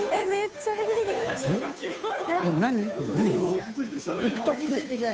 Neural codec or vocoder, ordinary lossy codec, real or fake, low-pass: codec, 16 kHz, 2 kbps, FunCodec, trained on Chinese and English, 25 frames a second; none; fake; none